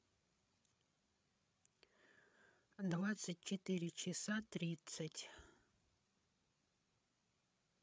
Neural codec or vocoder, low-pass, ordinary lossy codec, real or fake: codec, 16 kHz, 8 kbps, FreqCodec, larger model; none; none; fake